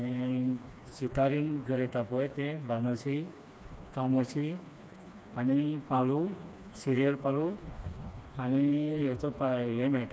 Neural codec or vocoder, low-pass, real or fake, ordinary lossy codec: codec, 16 kHz, 2 kbps, FreqCodec, smaller model; none; fake; none